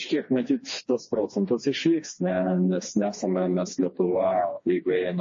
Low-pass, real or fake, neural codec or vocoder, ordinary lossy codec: 7.2 kHz; fake; codec, 16 kHz, 2 kbps, FreqCodec, smaller model; MP3, 32 kbps